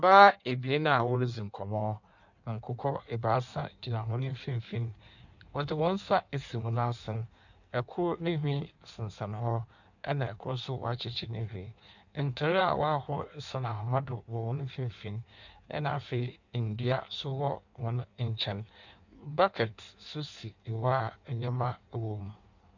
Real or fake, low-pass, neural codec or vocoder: fake; 7.2 kHz; codec, 16 kHz in and 24 kHz out, 1.1 kbps, FireRedTTS-2 codec